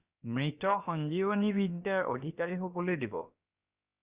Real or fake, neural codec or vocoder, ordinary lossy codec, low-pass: fake; codec, 16 kHz, about 1 kbps, DyCAST, with the encoder's durations; Opus, 64 kbps; 3.6 kHz